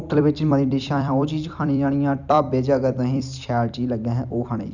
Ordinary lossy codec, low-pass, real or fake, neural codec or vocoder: none; 7.2 kHz; fake; vocoder, 44.1 kHz, 128 mel bands every 256 samples, BigVGAN v2